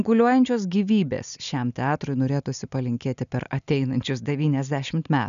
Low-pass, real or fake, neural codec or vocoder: 7.2 kHz; real; none